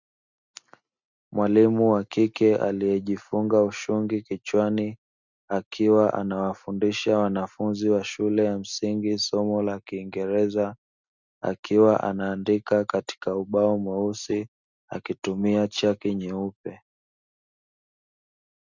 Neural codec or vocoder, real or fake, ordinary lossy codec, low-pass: none; real; Opus, 64 kbps; 7.2 kHz